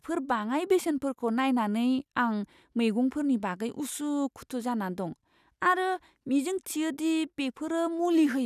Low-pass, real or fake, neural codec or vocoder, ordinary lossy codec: 14.4 kHz; real; none; none